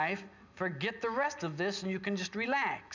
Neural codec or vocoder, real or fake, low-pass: none; real; 7.2 kHz